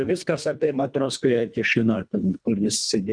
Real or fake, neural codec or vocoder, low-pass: fake; codec, 24 kHz, 1.5 kbps, HILCodec; 9.9 kHz